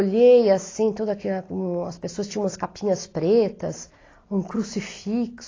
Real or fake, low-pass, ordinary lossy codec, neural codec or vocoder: real; 7.2 kHz; AAC, 32 kbps; none